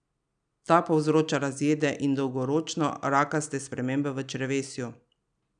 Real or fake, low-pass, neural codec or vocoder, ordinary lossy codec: real; 9.9 kHz; none; none